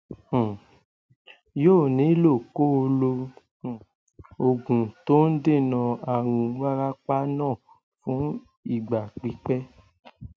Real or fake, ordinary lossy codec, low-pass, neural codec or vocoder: real; none; none; none